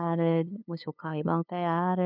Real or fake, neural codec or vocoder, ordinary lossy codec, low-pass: fake; codec, 16 kHz, 2 kbps, FunCodec, trained on LibriTTS, 25 frames a second; none; 5.4 kHz